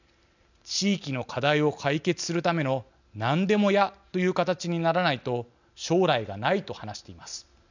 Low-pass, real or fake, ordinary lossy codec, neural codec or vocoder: 7.2 kHz; real; none; none